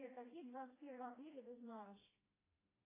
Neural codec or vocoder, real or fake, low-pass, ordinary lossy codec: codec, 16 kHz, 1 kbps, FreqCodec, smaller model; fake; 3.6 kHz; AAC, 16 kbps